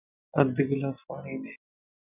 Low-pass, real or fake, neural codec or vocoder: 3.6 kHz; real; none